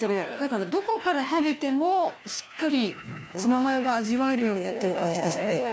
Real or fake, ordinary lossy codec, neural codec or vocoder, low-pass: fake; none; codec, 16 kHz, 1 kbps, FunCodec, trained on LibriTTS, 50 frames a second; none